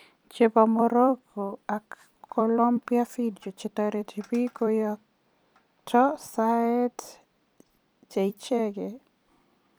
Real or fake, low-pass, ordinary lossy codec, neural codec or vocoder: fake; none; none; vocoder, 44.1 kHz, 128 mel bands every 256 samples, BigVGAN v2